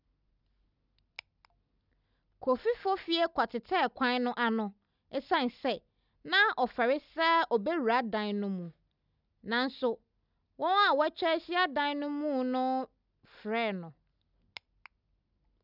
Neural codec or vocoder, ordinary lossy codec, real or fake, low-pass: none; none; real; 5.4 kHz